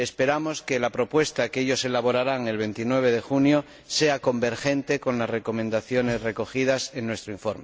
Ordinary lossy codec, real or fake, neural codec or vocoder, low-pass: none; real; none; none